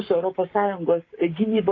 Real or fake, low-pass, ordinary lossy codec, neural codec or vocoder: fake; 7.2 kHz; AAC, 32 kbps; codec, 44.1 kHz, 7.8 kbps, Pupu-Codec